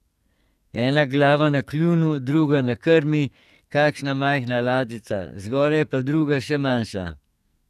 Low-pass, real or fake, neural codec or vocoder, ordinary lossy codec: 14.4 kHz; fake; codec, 44.1 kHz, 2.6 kbps, SNAC; none